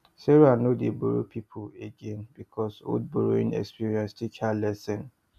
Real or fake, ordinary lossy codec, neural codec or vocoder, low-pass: real; none; none; 14.4 kHz